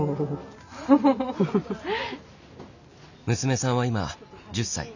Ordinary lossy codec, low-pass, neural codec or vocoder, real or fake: none; 7.2 kHz; none; real